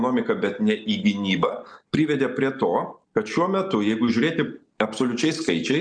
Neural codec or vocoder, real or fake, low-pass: none; real; 9.9 kHz